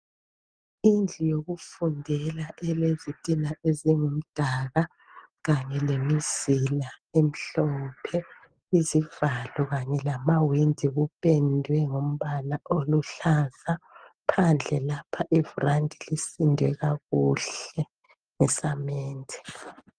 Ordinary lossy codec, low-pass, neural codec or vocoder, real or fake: Opus, 24 kbps; 9.9 kHz; none; real